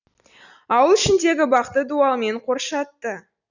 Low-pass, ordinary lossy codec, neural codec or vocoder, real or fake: 7.2 kHz; none; none; real